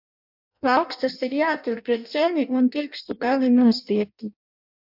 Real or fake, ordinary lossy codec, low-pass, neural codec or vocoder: fake; MP3, 48 kbps; 5.4 kHz; codec, 16 kHz in and 24 kHz out, 0.6 kbps, FireRedTTS-2 codec